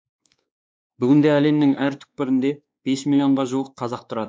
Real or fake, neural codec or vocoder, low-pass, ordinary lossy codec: fake; codec, 16 kHz, 2 kbps, X-Codec, WavLM features, trained on Multilingual LibriSpeech; none; none